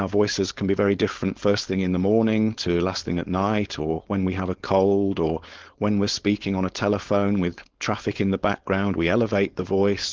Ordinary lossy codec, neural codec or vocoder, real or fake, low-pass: Opus, 16 kbps; codec, 16 kHz, 4.8 kbps, FACodec; fake; 7.2 kHz